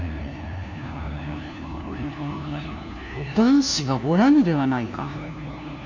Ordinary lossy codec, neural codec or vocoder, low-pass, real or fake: none; codec, 16 kHz, 1 kbps, FunCodec, trained on LibriTTS, 50 frames a second; 7.2 kHz; fake